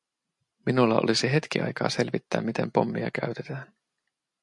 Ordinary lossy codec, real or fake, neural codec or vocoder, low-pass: MP3, 64 kbps; real; none; 10.8 kHz